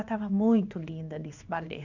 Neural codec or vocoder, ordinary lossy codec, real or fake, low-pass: codec, 16 kHz in and 24 kHz out, 1 kbps, XY-Tokenizer; none; fake; 7.2 kHz